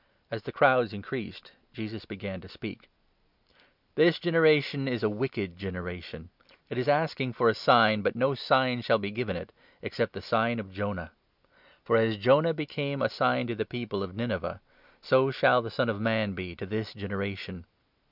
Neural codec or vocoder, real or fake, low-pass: none; real; 5.4 kHz